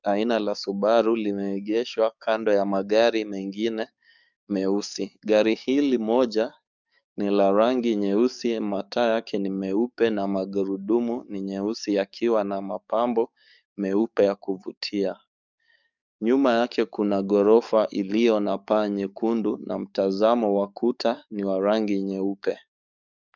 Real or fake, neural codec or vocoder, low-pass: fake; codec, 44.1 kHz, 7.8 kbps, DAC; 7.2 kHz